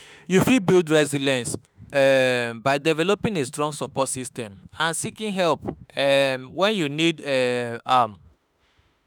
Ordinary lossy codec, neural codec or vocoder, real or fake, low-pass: none; autoencoder, 48 kHz, 32 numbers a frame, DAC-VAE, trained on Japanese speech; fake; none